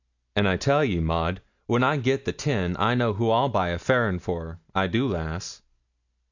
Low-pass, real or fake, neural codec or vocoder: 7.2 kHz; real; none